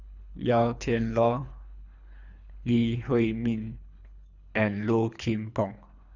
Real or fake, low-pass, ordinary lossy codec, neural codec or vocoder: fake; 7.2 kHz; none; codec, 24 kHz, 3 kbps, HILCodec